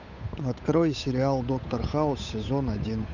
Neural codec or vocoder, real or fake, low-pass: codec, 16 kHz, 8 kbps, FunCodec, trained on Chinese and English, 25 frames a second; fake; 7.2 kHz